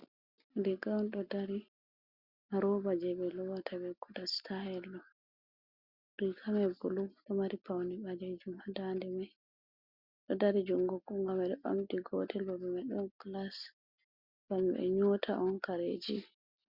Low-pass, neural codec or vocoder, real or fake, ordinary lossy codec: 5.4 kHz; none; real; Opus, 64 kbps